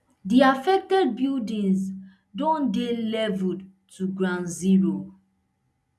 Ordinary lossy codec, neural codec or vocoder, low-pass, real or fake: none; none; none; real